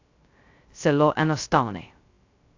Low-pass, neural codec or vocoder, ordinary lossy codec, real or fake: 7.2 kHz; codec, 16 kHz, 0.2 kbps, FocalCodec; AAC, 48 kbps; fake